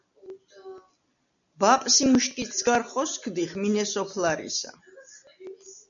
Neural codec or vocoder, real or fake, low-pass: none; real; 7.2 kHz